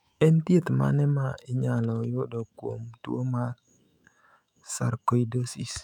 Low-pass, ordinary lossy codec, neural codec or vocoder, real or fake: 19.8 kHz; none; autoencoder, 48 kHz, 128 numbers a frame, DAC-VAE, trained on Japanese speech; fake